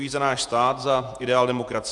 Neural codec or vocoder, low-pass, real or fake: vocoder, 48 kHz, 128 mel bands, Vocos; 10.8 kHz; fake